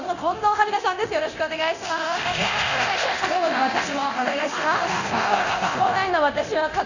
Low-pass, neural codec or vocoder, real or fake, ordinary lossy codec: 7.2 kHz; codec, 24 kHz, 0.9 kbps, DualCodec; fake; none